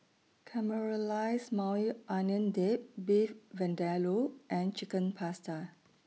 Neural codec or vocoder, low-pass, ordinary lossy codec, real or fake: none; none; none; real